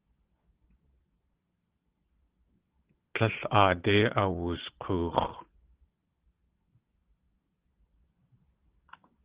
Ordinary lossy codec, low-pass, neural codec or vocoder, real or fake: Opus, 16 kbps; 3.6 kHz; vocoder, 22.05 kHz, 80 mel bands, Vocos; fake